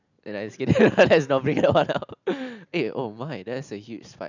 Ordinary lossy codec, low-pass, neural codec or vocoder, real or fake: none; 7.2 kHz; none; real